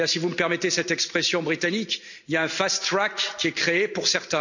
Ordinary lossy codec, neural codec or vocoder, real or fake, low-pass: none; none; real; 7.2 kHz